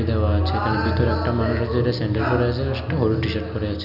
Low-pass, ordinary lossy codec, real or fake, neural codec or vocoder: 5.4 kHz; none; real; none